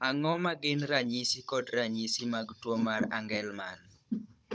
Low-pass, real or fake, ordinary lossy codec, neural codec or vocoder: none; fake; none; codec, 16 kHz, 16 kbps, FunCodec, trained on Chinese and English, 50 frames a second